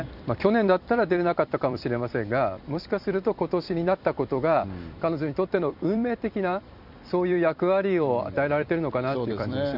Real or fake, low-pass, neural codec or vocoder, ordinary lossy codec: fake; 5.4 kHz; vocoder, 44.1 kHz, 128 mel bands every 256 samples, BigVGAN v2; none